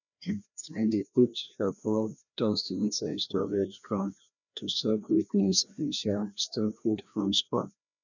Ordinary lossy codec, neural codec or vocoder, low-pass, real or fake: none; codec, 16 kHz, 1 kbps, FreqCodec, larger model; 7.2 kHz; fake